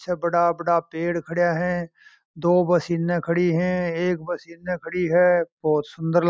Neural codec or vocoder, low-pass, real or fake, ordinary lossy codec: none; none; real; none